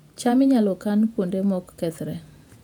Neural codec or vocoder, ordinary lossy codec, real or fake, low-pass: vocoder, 48 kHz, 128 mel bands, Vocos; none; fake; 19.8 kHz